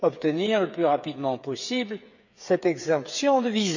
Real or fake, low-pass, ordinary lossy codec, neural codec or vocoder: fake; 7.2 kHz; none; codec, 16 kHz, 8 kbps, FreqCodec, smaller model